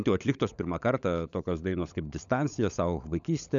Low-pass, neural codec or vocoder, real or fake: 7.2 kHz; codec, 16 kHz, 16 kbps, FunCodec, trained on LibriTTS, 50 frames a second; fake